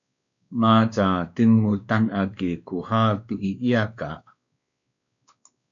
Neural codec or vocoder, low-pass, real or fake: codec, 16 kHz, 2 kbps, X-Codec, WavLM features, trained on Multilingual LibriSpeech; 7.2 kHz; fake